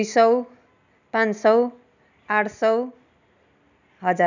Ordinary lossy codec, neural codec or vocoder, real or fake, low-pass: none; none; real; 7.2 kHz